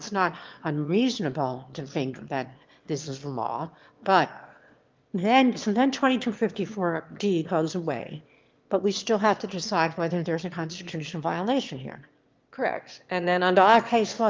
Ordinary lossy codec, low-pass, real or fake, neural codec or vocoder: Opus, 32 kbps; 7.2 kHz; fake; autoencoder, 22.05 kHz, a latent of 192 numbers a frame, VITS, trained on one speaker